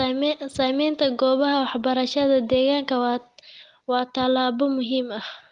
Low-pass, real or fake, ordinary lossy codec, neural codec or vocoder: 10.8 kHz; real; Opus, 24 kbps; none